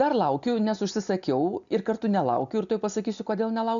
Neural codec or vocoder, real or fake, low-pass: none; real; 7.2 kHz